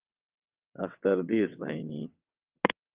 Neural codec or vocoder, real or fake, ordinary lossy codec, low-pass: vocoder, 22.05 kHz, 80 mel bands, WaveNeXt; fake; Opus, 32 kbps; 3.6 kHz